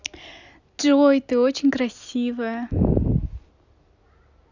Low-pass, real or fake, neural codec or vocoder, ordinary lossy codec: 7.2 kHz; real; none; none